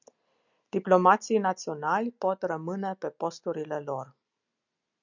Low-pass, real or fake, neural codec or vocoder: 7.2 kHz; real; none